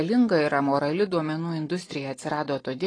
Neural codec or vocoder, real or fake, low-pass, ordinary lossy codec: none; real; 9.9 kHz; AAC, 32 kbps